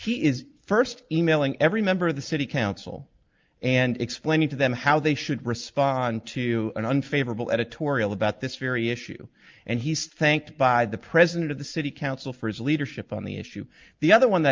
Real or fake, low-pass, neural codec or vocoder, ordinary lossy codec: real; 7.2 kHz; none; Opus, 24 kbps